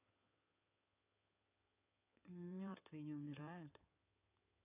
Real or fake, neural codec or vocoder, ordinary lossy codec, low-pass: fake; codec, 16 kHz in and 24 kHz out, 2.2 kbps, FireRedTTS-2 codec; AAC, 24 kbps; 3.6 kHz